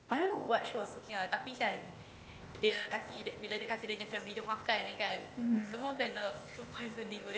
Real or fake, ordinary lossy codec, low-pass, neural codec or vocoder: fake; none; none; codec, 16 kHz, 0.8 kbps, ZipCodec